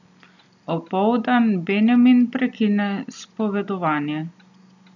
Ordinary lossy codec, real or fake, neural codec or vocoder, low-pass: none; real; none; 7.2 kHz